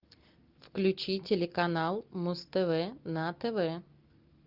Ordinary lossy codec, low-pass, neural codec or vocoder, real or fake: Opus, 24 kbps; 5.4 kHz; none; real